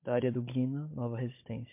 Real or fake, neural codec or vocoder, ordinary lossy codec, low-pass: fake; codec, 16 kHz, 16 kbps, FunCodec, trained on Chinese and English, 50 frames a second; MP3, 24 kbps; 3.6 kHz